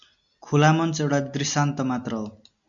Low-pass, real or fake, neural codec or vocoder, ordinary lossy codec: 7.2 kHz; real; none; MP3, 64 kbps